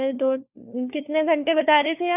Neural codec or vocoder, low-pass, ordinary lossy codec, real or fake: codec, 16 kHz, 4 kbps, FunCodec, trained on LibriTTS, 50 frames a second; 3.6 kHz; none; fake